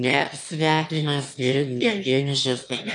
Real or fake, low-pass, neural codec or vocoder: fake; 9.9 kHz; autoencoder, 22.05 kHz, a latent of 192 numbers a frame, VITS, trained on one speaker